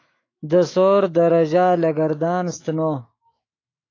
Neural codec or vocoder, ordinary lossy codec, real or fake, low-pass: autoencoder, 48 kHz, 128 numbers a frame, DAC-VAE, trained on Japanese speech; AAC, 32 kbps; fake; 7.2 kHz